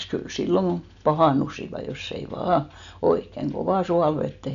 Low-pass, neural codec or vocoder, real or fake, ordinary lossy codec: 7.2 kHz; none; real; none